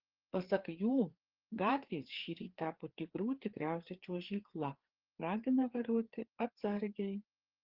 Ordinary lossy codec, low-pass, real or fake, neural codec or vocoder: Opus, 16 kbps; 5.4 kHz; fake; codec, 16 kHz, 4 kbps, FreqCodec, larger model